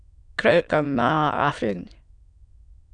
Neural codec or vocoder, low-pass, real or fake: autoencoder, 22.05 kHz, a latent of 192 numbers a frame, VITS, trained on many speakers; 9.9 kHz; fake